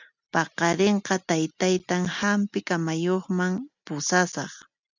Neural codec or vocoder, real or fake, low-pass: none; real; 7.2 kHz